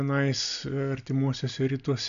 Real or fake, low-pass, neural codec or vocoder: real; 7.2 kHz; none